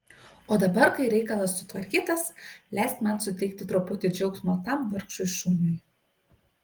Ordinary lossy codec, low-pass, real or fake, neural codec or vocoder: Opus, 24 kbps; 19.8 kHz; real; none